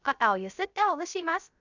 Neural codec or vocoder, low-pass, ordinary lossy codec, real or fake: codec, 16 kHz, 0.3 kbps, FocalCodec; 7.2 kHz; none; fake